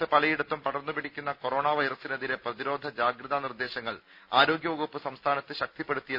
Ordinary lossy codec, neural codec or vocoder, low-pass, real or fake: none; none; 5.4 kHz; real